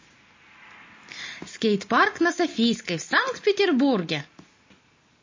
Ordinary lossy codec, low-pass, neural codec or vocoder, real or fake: MP3, 32 kbps; 7.2 kHz; none; real